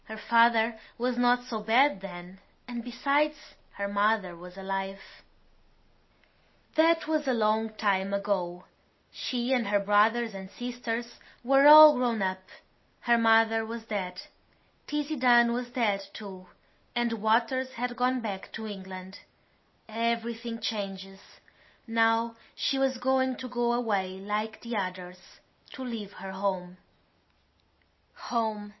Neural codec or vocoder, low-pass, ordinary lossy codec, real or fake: none; 7.2 kHz; MP3, 24 kbps; real